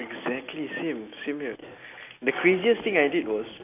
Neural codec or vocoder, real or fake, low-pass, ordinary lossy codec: none; real; 3.6 kHz; AAC, 24 kbps